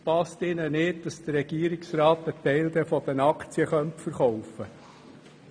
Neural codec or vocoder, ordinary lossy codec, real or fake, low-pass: none; none; real; 9.9 kHz